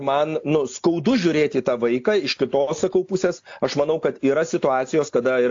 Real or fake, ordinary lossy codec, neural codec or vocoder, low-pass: real; AAC, 48 kbps; none; 7.2 kHz